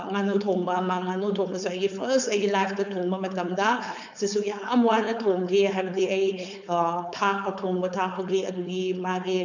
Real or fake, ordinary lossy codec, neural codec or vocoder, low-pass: fake; none; codec, 16 kHz, 4.8 kbps, FACodec; 7.2 kHz